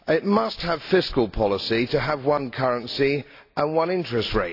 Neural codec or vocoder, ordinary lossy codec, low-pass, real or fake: none; AAC, 32 kbps; 5.4 kHz; real